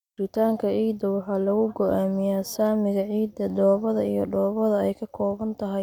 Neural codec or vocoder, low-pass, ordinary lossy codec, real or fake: none; 19.8 kHz; none; real